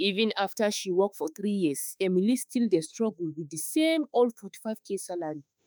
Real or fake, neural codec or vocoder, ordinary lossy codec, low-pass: fake; autoencoder, 48 kHz, 32 numbers a frame, DAC-VAE, trained on Japanese speech; none; none